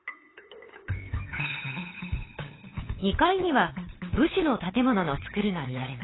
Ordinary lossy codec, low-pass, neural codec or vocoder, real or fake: AAC, 16 kbps; 7.2 kHz; codec, 16 kHz, 8 kbps, FunCodec, trained on LibriTTS, 25 frames a second; fake